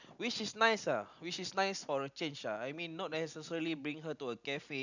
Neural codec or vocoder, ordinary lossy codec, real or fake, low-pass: none; none; real; 7.2 kHz